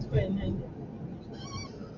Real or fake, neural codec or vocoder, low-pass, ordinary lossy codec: real; none; 7.2 kHz; AAC, 48 kbps